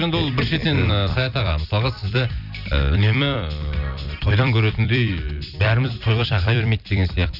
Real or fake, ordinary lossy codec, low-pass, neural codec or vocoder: fake; none; 5.4 kHz; vocoder, 44.1 kHz, 128 mel bands every 256 samples, BigVGAN v2